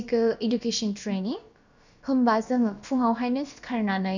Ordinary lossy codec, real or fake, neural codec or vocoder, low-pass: none; fake; codec, 16 kHz, about 1 kbps, DyCAST, with the encoder's durations; 7.2 kHz